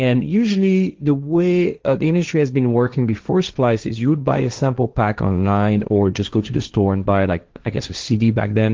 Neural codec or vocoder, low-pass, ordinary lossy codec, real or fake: codec, 16 kHz, 1.1 kbps, Voila-Tokenizer; 7.2 kHz; Opus, 32 kbps; fake